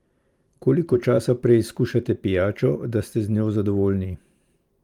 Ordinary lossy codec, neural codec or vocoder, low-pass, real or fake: Opus, 32 kbps; vocoder, 44.1 kHz, 128 mel bands every 512 samples, BigVGAN v2; 19.8 kHz; fake